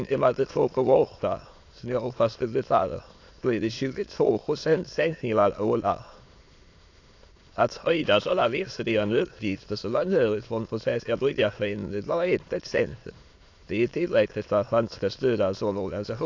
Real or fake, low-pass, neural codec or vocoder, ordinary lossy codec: fake; 7.2 kHz; autoencoder, 22.05 kHz, a latent of 192 numbers a frame, VITS, trained on many speakers; AAC, 48 kbps